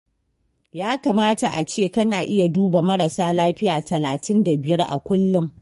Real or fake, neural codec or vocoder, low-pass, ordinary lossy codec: fake; codec, 44.1 kHz, 2.6 kbps, SNAC; 14.4 kHz; MP3, 48 kbps